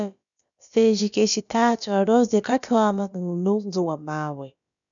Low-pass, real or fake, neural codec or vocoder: 7.2 kHz; fake; codec, 16 kHz, about 1 kbps, DyCAST, with the encoder's durations